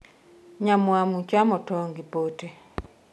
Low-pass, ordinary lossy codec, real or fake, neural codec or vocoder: none; none; real; none